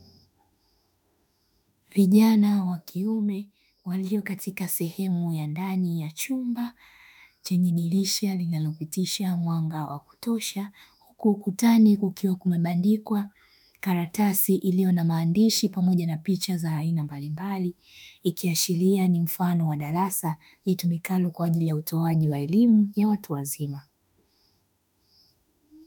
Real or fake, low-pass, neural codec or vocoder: fake; 19.8 kHz; autoencoder, 48 kHz, 32 numbers a frame, DAC-VAE, trained on Japanese speech